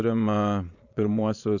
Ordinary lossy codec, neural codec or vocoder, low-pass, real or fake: Opus, 64 kbps; vocoder, 22.05 kHz, 80 mel bands, Vocos; 7.2 kHz; fake